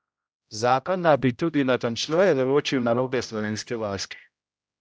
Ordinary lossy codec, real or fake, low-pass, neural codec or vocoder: none; fake; none; codec, 16 kHz, 0.5 kbps, X-Codec, HuBERT features, trained on general audio